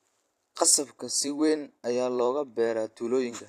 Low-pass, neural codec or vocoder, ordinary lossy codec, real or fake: 14.4 kHz; vocoder, 44.1 kHz, 128 mel bands every 512 samples, BigVGAN v2; AAC, 64 kbps; fake